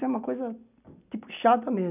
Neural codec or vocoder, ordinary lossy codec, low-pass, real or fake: none; none; 3.6 kHz; real